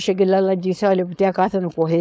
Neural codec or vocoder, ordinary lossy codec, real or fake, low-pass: codec, 16 kHz, 4.8 kbps, FACodec; none; fake; none